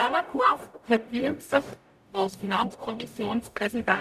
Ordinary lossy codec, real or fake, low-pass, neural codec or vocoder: none; fake; 14.4 kHz; codec, 44.1 kHz, 0.9 kbps, DAC